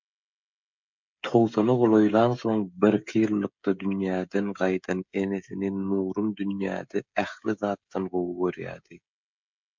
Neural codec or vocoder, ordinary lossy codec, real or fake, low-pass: codec, 16 kHz, 16 kbps, FreqCodec, smaller model; MP3, 64 kbps; fake; 7.2 kHz